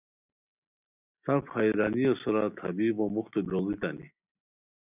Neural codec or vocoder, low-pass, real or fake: none; 3.6 kHz; real